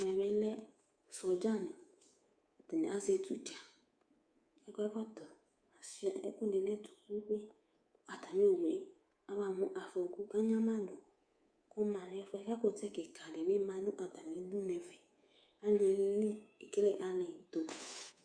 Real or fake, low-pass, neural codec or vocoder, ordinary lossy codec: fake; 9.9 kHz; codec, 24 kHz, 3.1 kbps, DualCodec; Opus, 64 kbps